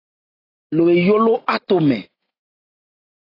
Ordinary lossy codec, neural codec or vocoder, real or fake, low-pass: AAC, 24 kbps; none; real; 5.4 kHz